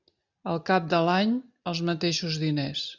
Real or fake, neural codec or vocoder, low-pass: real; none; 7.2 kHz